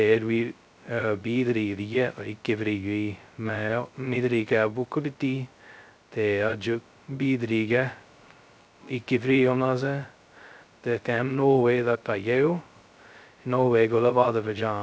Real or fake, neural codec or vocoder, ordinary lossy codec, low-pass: fake; codec, 16 kHz, 0.2 kbps, FocalCodec; none; none